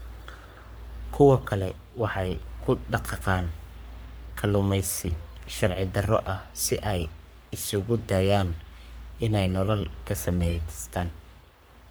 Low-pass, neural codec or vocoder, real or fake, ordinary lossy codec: none; codec, 44.1 kHz, 3.4 kbps, Pupu-Codec; fake; none